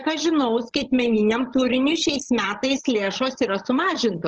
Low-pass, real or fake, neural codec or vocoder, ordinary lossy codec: 7.2 kHz; fake; codec, 16 kHz, 16 kbps, FreqCodec, larger model; Opus, 16 kbps